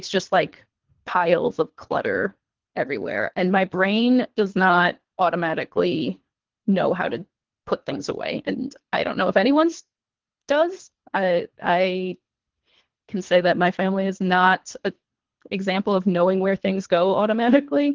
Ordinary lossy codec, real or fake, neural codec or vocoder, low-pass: Opus, 16 kbps; fake; codec, 24 kHz, 3 kbps, HILCodec; 7.2 kHz